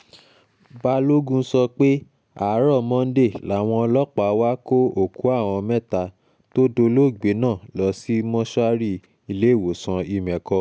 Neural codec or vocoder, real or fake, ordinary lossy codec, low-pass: none; real; none; none